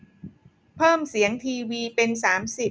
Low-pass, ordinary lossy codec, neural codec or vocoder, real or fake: none; none; none; real